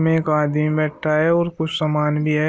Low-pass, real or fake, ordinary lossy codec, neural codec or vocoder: none; real; none; none